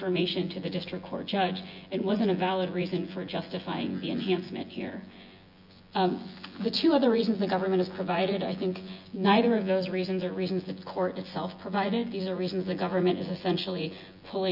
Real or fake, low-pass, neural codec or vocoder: fake; 5.4 kHz; vocoder, 24 kHz, 100 mel bands, Vocos